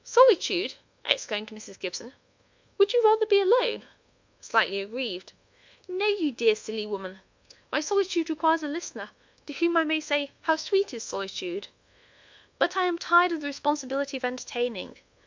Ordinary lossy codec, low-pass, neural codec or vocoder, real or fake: MP3, 64 kbps; 7.2 kHz; codec, 24 kHz, 1.2 kbps, DualCodec; fake